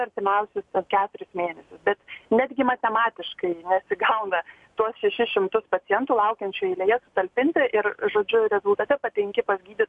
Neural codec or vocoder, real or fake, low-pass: none; real; 10.8 kHz